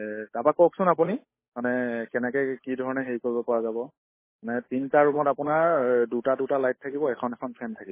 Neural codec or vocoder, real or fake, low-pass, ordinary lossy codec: codec, 16 kHz, 8 kbps, FunCodec, trained on Chinese and English, 25 frames a second; fake; 3.6 kHz; MP3, 16 kbps